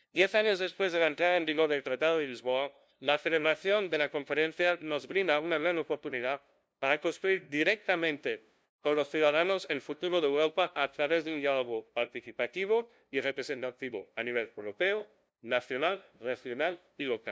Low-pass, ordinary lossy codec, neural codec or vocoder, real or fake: none; none; codec, 16 kHz, 0.5 kbps, FunCodec, trained on LibriTTS, 25 frames a second; fake